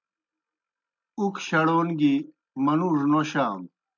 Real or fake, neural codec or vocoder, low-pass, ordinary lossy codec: real; none; 7.2 kHz; MP3, 64 kbps